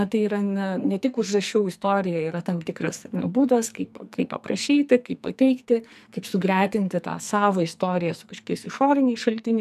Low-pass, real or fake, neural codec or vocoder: 14.4 kHz; fake; codec, 44.1 kHz, 2.6 kbps, SNAC